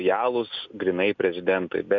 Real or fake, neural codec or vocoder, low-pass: real; none; 7.2 kHz